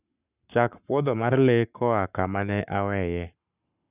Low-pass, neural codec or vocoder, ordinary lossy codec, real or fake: 3.6 kHz; codec, 44.1 kHz, 7.8 kbps, Pupu-Codec; none; fake